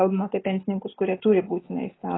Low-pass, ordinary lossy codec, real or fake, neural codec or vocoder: 7.2 kHz; AAC, 16 kbps; real; none